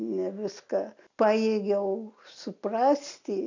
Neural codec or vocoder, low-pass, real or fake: none; 7.2 kHz; real